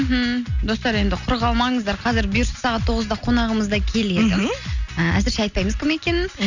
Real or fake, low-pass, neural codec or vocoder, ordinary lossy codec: real; 7.2 kHz; none; none